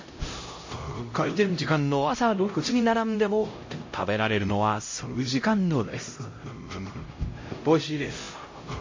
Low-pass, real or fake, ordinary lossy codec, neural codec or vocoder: 7.2 kHz; fake; MP3, 32 kbps; codec, 16 kHz, 0.5 kbps, X-Codec, HuBERT features, trained on LibriSpeech